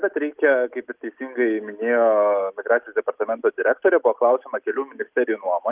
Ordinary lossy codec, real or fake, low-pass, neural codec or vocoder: Opus, 24 kbps; real; 3.6 kHz; none